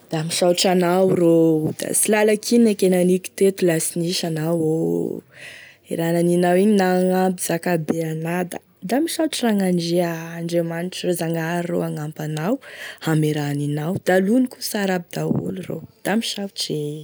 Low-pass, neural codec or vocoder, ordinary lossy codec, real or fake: none; none; none; real